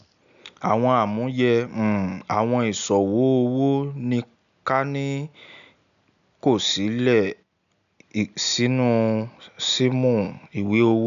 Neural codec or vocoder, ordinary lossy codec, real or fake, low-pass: none; none; real; 7.2 kHz